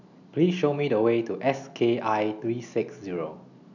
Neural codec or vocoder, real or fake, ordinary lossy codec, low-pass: none; real; none; 7.2 kHz